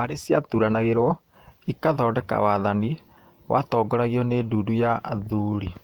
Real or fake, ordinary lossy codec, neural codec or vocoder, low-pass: fake; Opus, 32 kbps; vocoder, 48 kHz, 128 mel bands, Vocos; 19.8 kHz